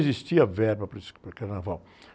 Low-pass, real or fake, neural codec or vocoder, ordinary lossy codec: none; real; none; none